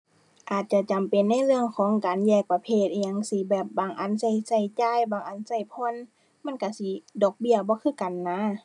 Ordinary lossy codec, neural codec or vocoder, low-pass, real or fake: none; none; 10.8 kHz; real